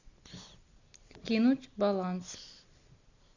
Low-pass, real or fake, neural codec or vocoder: 7.2 kHz; real; none